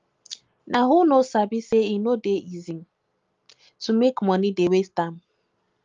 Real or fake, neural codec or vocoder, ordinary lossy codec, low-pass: real; none; Opus, 32 kbps; 7.2 kHz